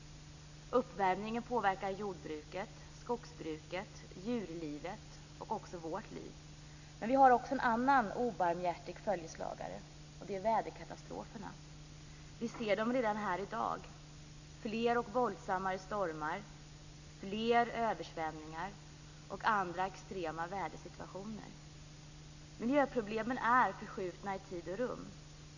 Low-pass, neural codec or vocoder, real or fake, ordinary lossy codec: 7.2 kHz; none; real; none